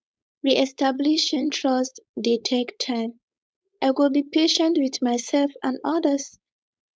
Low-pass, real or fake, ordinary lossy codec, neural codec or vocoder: none; fake; none; codec, 16 kHz, 4.8 kbps, FACodec